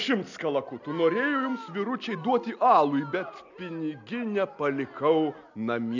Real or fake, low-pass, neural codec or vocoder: real; 7.2 kHz; none